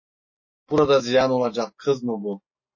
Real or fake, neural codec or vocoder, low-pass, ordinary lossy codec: real; none; 7.2 kHz; MP3, 32 kbps